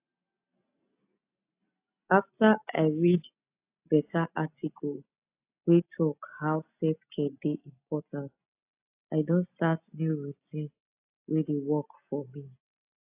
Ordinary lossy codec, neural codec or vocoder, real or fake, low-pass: AAC, 32 kbps; none; real; 3.6 kHz